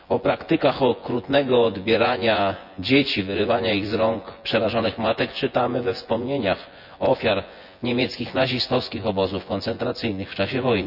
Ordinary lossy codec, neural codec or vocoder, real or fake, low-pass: none; vocoder, 24 kHz, 100 mel bands, Vocos; fake; 5.4 kHz